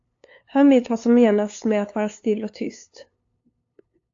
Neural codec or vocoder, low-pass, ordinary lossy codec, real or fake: codec, 16 kHz, 2 kbps, FunCodec, trained on LibriTTS, 25 frames a second; 7.2 kHz; AAC, 48 kbps; fake